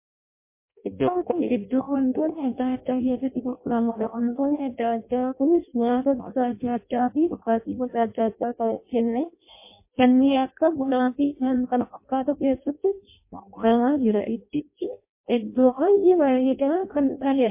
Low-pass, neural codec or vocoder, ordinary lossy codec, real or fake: 3.6 kHz; codec, 16 kHz in and 24 kHz out, 0.6 kbps, FireRedTTS-2 codec; MP3, 24 kbps; fake